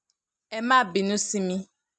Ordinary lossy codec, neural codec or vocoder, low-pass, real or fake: none; none; 9.9 kHz; real